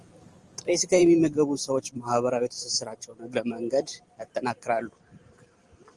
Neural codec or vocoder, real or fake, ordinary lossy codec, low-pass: vocoder, 44.1 kHz, 128 mel bands every 512 samples, BigVGAN v2; fake; Opus, 24 kbps; 10.8 kHz